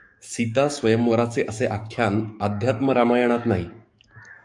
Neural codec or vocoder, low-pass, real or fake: codec, 44.1 kHz, 7.8 kbps, DAC; 10.8 kHz; fake